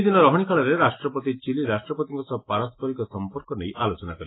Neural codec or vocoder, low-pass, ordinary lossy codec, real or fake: none; 7.2 kHz; AAC, 16 kbps; real